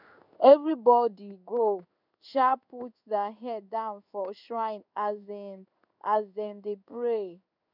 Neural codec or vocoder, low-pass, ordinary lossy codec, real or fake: codec, 16 kHz in and 24 kHz out, 1 kbps, XY-Tokenizer; 5.4 kHz; none; fake